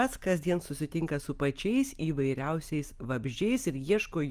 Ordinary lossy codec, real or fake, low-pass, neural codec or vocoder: Opus, 32 kbps; real; 14.4 kHz; none